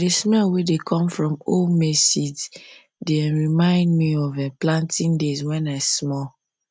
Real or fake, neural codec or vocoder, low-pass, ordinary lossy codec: real; none; none; none